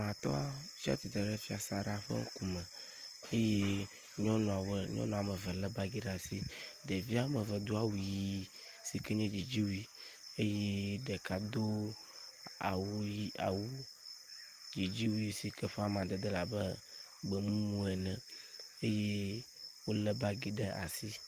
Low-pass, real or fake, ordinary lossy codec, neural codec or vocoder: 14.4 kHz; real; Opus, 24 kbps; none